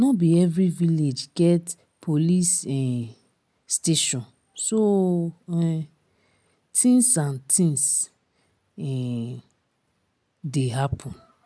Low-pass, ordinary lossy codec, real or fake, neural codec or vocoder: none; none; real; none